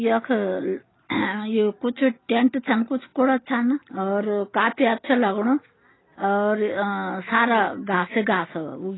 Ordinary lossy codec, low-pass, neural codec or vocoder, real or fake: AAC, 16 kbps; 7.2 kHz; none; real